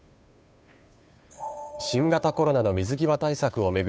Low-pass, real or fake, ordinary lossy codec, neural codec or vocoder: none; fake; none; codec, 16 kHz, 2 kbps, FunCodec, trained on Chinese and English, 25 frames a second